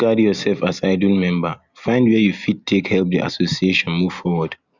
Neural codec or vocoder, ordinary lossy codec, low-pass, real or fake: none; Opus, 64 kbps; 7.2 kHz; real